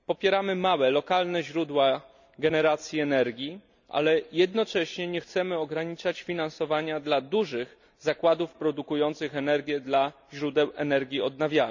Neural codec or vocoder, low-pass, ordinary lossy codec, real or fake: none; 7.2 kHz; none; real